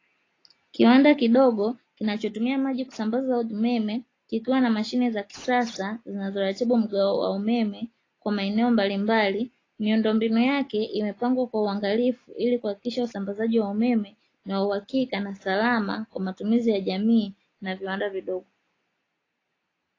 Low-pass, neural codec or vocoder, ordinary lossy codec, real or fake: 7.2 kHz; none; AAC, 32 kbps; real